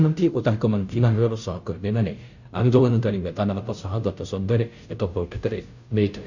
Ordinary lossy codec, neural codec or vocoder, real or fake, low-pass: none; codec, 16 kHz, 0.5 kbps, FunCodec, trained on Chinese and English, 25 frames a second; fake; 7.2 kHz